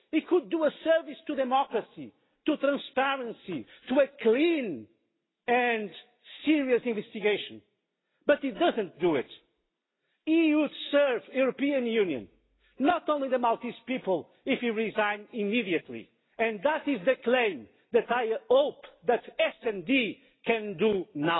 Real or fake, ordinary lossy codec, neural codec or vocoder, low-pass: real; AAC, 16 kbps; none; 7.2 kHz